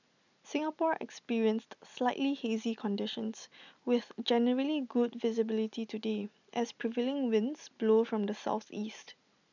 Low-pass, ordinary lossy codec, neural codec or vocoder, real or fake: 7.2 kHz; none; none; real